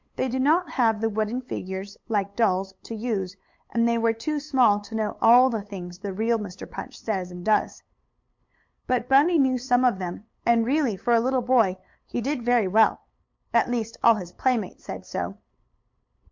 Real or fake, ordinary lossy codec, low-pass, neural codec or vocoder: fake; MP3, 48 kbps; 7.2 kHz; codec, 16 kHz, 8 kbps, FunCodec, trained on LibriTTS, 25 frames a second